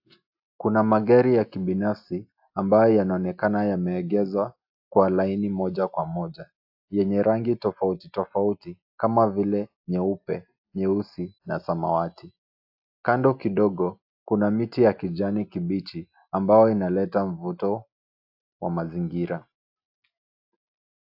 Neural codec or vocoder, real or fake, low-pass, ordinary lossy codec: none; real; 5.4 kHz; AAC, 48 kbps